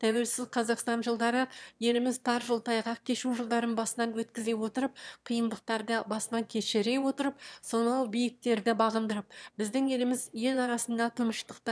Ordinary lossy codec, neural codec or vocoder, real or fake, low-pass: none; autoencoder, 22.05 kHz, a latent of 192 numbers a frame, VITS, trained on one speaker; fake; none